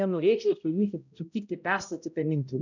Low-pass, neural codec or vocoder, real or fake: 7.2 kHz; codec, 16 kHz, 0.5 kbps, X-Codec, HuBERT features, trained on balanced general audio; fake